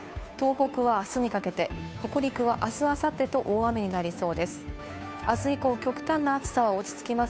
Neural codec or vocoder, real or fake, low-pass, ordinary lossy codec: codec, 16 kHz, 2 kbps, FunCodec, trained on Chinese and English, 25 frames a second; fake; none; none